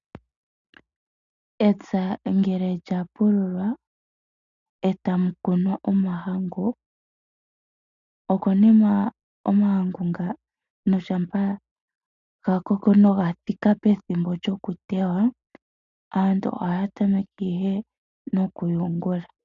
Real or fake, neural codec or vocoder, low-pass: real; none; 7.2 kHz